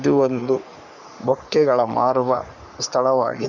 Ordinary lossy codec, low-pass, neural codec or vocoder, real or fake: none; 7.2 kHz; codec, 44.1 kHz, 3.4 kbps, Pupu-Codec; fake